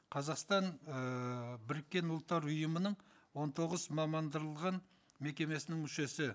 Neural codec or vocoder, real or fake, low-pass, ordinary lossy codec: none; real; none; none